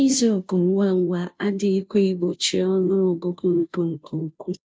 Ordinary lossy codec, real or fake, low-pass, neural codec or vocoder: none; fake; none; codec, 16 kHz, 0.5 kbps, FunCodec, trained on Chinese and English, 25 frames a second